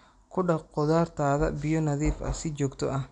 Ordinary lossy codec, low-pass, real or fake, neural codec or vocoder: none; 9.9 kHz; real; none